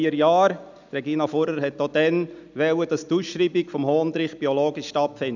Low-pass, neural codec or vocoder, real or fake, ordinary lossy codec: 7.2 kHz; none; real; none